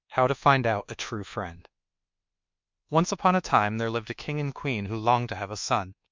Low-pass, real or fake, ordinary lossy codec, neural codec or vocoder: 7.2 kHz; fake; MP3, 64 kbps; codec, 24 kHz, 1.2 kbps, DualCodec